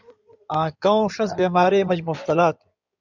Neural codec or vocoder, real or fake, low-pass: codec, 16 kHz in and 24 kHz out, 2.2 kbps, FireRedTTS-2 codec; fake; 7.2 kHz